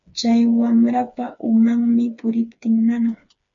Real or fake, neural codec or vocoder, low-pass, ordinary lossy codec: fake; codec, 16 kHz, 4 kbps, FreqCodec, smaller model; 7.2 kHz; MP3, 48 kbps